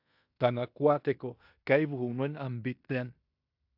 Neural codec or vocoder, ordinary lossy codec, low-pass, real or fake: codec, 16 kHz in and 24 kHz out, 0.9 kbps, LongCat-Audio-Codec, fine tuned four codebook decoder; AAC, 48 kbps; 5.4 kHz; fake